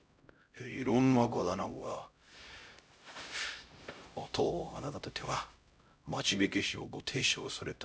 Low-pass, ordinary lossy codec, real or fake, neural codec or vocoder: none; none; fake; codec, 16 kHz, 0.5 kbps, X-Codec, HuBERT features, trained on LibriSpeech